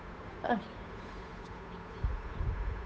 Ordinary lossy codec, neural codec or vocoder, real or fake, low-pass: none; codec, 16 kHz, 8 kbps, FunCodec, trained on Chinese and English, 25 frames a second; fake; none